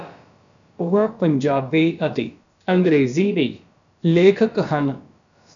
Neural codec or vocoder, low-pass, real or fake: codec, 16 kHz, about 1 kbps, DyCAST, with the encoder's durations; 7.2 kHz; fake